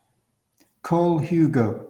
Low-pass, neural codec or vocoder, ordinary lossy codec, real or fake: 19.8 kHz; none; Opus, 24 kbps; real